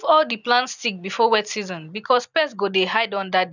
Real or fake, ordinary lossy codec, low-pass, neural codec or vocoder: fake; none; 7.2 kHz; vocoder, 44.1 kHz, 128 mel bands every 256 samples, BigVGAN v2